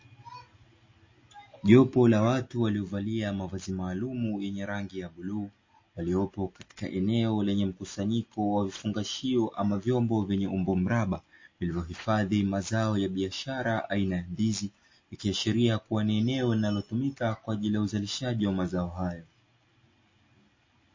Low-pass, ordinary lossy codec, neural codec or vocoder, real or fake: 7.2 kHz; MP3, 32 kbps; none; real